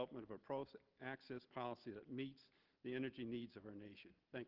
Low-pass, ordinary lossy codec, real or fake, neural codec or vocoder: 5.4 kHz; Opus, 16 kbps; real; none